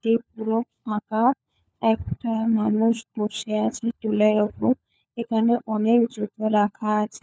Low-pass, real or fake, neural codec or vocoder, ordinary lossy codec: none; fake; codec, 16 kHz, 4 kbps, FunCodec, trained on LibriTTS, 50 frames a second; none